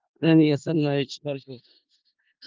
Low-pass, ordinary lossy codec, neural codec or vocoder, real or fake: 7.2 kHz; Opus, 32 kbps; codec, 16 kHz in and 24 kHz out, 0.4 kbps, LongCat-Audio-Codec, four codebook decoder; fake